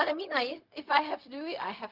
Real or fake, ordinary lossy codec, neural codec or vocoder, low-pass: fake; Opus, 24 kbps; codec, 16 kHz, 0.4 kbps, LongCat-Audio-Codec; 5.4 kHz